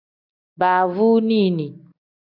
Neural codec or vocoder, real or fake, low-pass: none; real; 5.4 kHz